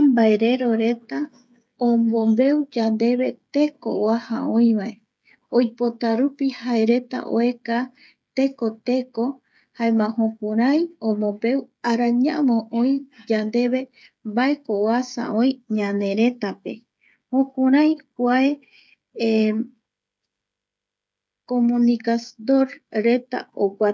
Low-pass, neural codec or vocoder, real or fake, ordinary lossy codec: none; codec, 16 kHz, 16 kbps, FreqCodec, smaller model; fake; none